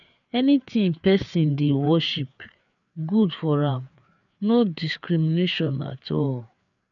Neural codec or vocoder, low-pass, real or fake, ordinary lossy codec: codec, 16 kHz, 4 kbps, FreqCodec, larger model; 7.2 kHz; fake; none